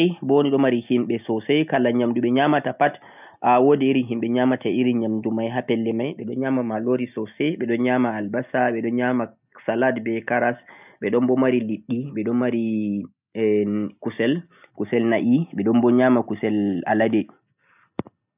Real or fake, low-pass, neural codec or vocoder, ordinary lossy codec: real; 3.6 kHz; none; none